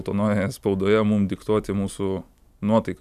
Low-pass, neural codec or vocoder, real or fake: 14.4 kHz; none; real